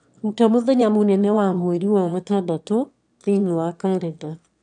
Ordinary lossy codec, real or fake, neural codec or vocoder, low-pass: none; fake; autoencoder, 22.05 kHz, a latent of 192 numbers a frame, VITS, trained on one speaker; 9.9 kHz